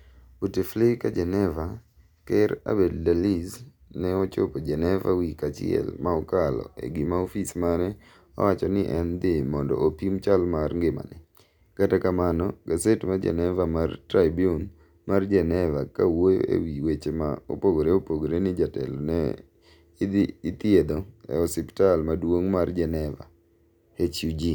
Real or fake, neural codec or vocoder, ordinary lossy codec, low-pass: real; none; none; 19.8 kHz